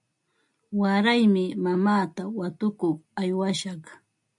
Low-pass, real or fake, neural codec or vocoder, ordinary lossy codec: 10.8 kHz; real; none; MP3, 64 kbps